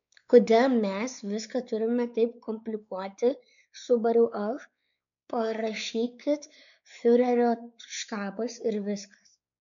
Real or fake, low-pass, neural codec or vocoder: fake; 7.2 kHz; codec, 16 kHz, 4 kbps, X-Codec, WavLM features, trained on Multilingual LibriSpeech